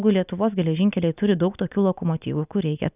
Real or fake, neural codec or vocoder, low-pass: real; none; 3.6 kHz